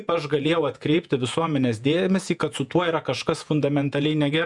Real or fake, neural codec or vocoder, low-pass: fake; vocoder, 48 kHz, 128 mel bands, Vocos; 10.8 kHz